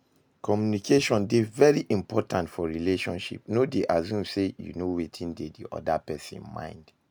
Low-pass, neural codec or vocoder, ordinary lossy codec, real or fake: none; none; none; real